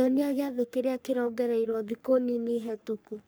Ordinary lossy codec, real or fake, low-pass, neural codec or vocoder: none; fake; none; codec, 44.1 kHz, 3.4 kbps, Pupu-Codec